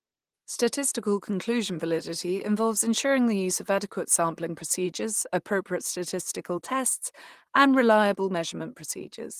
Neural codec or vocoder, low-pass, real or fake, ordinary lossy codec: vocoder, 44.1 kHz, 128 mel bands, Pupu-Vocoder; 14.4 kHz; fake; Opus, 24 kbps